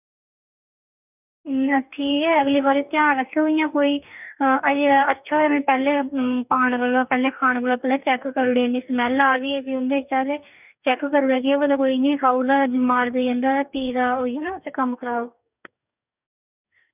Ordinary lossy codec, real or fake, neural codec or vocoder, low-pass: none; fake; codec, 44.1 kHz, 2.6 kbps, DAC; 3.6 kHz